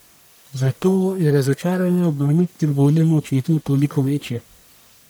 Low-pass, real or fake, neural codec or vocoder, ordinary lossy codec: none; fake; codec, 44.1 kHz, 1.7 kbps, Pupu-Codec; none